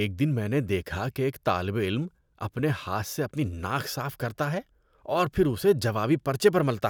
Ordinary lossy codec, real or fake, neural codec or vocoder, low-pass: none; real; none; none